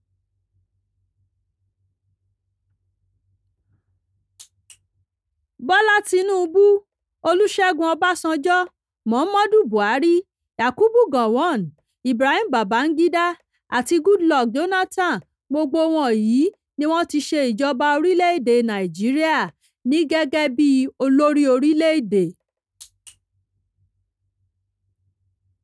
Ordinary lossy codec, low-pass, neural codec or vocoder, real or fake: none; none; none; real